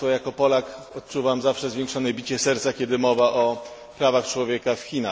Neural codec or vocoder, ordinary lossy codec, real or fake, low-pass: none; none; real; none